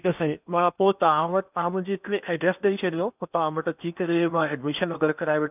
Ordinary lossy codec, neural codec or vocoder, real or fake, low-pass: none; codec, 16 kHz in and 24 kHz out, 0.8 kbps, FocalCodec, streaming, 65536 codes; fake; 3.6 kHz